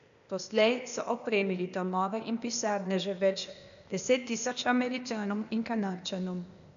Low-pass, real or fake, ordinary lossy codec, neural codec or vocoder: 7.2 kHz; fake; none; codec, 16 kHz, 0.8 kbps, ZipCodec